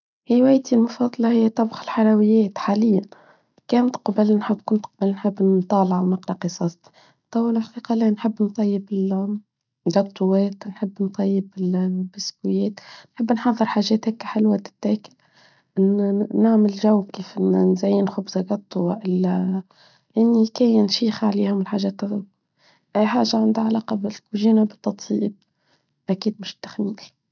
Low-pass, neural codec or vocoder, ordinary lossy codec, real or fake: 7.2 kHz; none; none; real